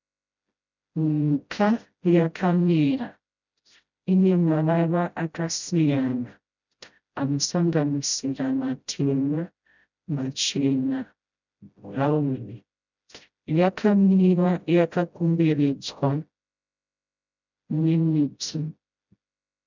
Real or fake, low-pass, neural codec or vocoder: fake; 7.2 kHz; codec, 16 kHz, 0.5 kbps, FreqCodec, smaller model